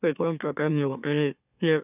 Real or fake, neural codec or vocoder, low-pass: fake; autoencoder, 44.1 kHz, a latent of 192 numbers a frame, MeloTTS; 3.6 kHz